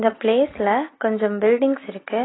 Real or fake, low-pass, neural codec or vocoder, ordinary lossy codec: real; 7.2 kHz; none; AAC, 16 kbps